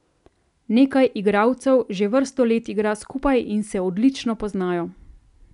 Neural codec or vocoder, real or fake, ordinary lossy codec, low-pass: none; real; none; 10.8 kHz